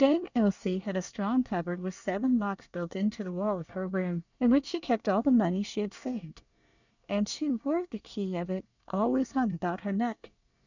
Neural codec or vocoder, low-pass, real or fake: codec, 24 kHz, 1 kbps, SNAC; 7.2 kHz; fake